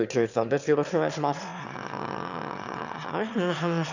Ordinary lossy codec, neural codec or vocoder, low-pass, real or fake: none; autoencoder, 22.05 kHz, a latent of 192 numbers a frame, VITS, trained on one speaker; 7.2 kHz; fake